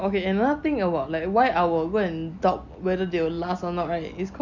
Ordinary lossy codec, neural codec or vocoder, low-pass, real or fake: none; none; 7.2 kHz; real